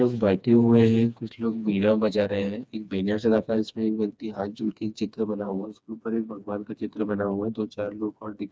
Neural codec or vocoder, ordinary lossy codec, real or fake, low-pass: codec, 16 kHz, 2 kbps, FreqCodec, smaller model; none; fake; none